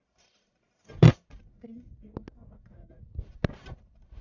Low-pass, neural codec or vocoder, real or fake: 7.2 kHz; codec, 44.1 kHz, 1.7 kbps, Pupu-Codec; fake